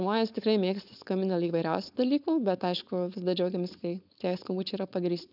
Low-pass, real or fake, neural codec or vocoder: 5.4 kHz; fake; codec, 16 kHz, 4.8 kbps, FACodec